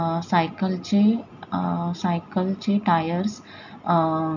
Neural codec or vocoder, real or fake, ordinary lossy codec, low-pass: none; real; none; 7.2 kHz